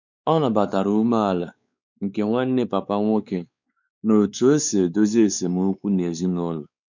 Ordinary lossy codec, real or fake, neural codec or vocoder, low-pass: none; fake; codec, 16 kHz, 4 kbps, X-Codec, WavLM features, trained on Multilingual LibriSpeech; 7.2 kHz